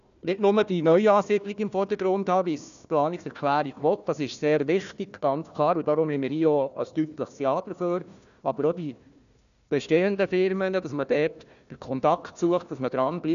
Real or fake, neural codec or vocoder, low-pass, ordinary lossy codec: fake; codec, 16 kHz, 1 kbps, FunCodec, trained on Chinese and English, 50 frames a second; 7.2 kHz; none